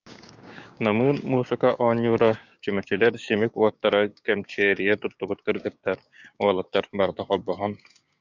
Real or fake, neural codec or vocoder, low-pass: fake; codec, 16 kHz, 6 kbps, DAC; 7.2 kHz